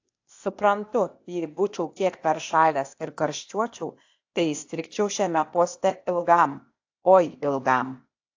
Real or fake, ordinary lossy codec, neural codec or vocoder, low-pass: fake; AAC, 48 kbps; codec, 16 kHz, 0.8 kbps, ZipCodec; 7.2 kHz